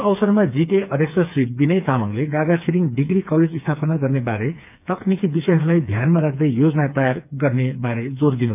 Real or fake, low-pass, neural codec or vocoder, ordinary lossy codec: fake; 3.6 kHz; codec, 16 kHz, 4 kbps, FreqCodec, smaller model; none